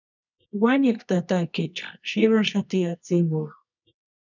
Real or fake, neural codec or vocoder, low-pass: fake; codec, 24 kHz, 0.9 kbps, WavTokenizer, medium music audio release; 7.2 kHz